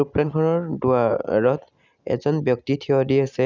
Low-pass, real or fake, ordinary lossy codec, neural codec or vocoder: 7.2 kHz; real; none; none